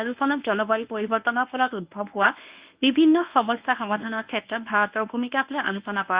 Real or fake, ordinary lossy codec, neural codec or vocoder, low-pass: fake; Opus, 64 kbps; codec, 24 kHz, 0.9 kbps, WavTokenizer, medium speech release version 1; 3.6 kHz